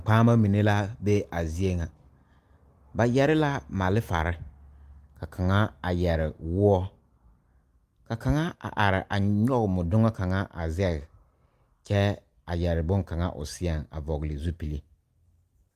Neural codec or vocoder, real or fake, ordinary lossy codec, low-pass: none; real; Opus, 32 kbps; 14.4 kHz